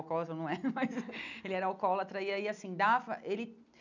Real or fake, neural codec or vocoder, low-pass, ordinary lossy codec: real; none; 7.2 kHz; none